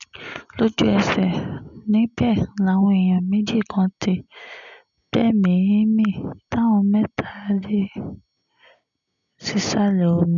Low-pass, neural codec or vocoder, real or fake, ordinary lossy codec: 7.2 kHz; none; real; none